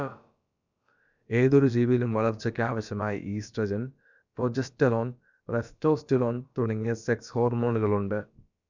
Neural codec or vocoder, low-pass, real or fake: codec, 16 kHz, about 1 kbps, DyCAST, with the encoder's durations; 7.2 kHz; fake